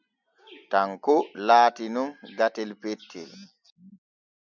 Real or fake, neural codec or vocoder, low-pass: real; none; 7.2 kHz